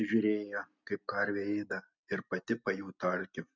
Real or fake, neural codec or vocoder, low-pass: real; none; 7.2 kHz